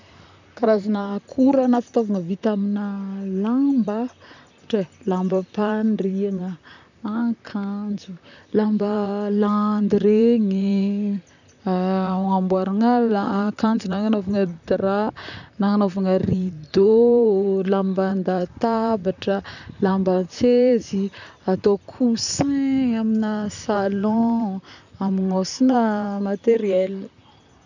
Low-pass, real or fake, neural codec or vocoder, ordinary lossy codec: 7.2 kHz; fake; vocoder, 44.1 kHz, 128 mel bands, Pupu-Vocoder; none